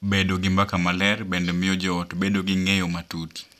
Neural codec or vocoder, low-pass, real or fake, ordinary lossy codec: none; 14.4 kHz; real; none